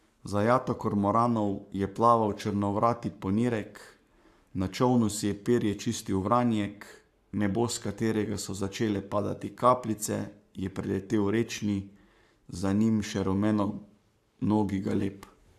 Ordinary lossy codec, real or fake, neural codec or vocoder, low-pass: AAC, 96 kbps; fake; codec, 44.1 kHz, 7.8 kbps, Pupu-Codec; 14.4 kHz